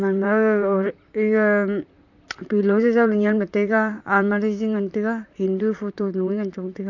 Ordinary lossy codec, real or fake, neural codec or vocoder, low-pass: none; fake; vocoder, 44.1 kHz, 128 mel bands, Pupu-Vocoder; 7.2 kHz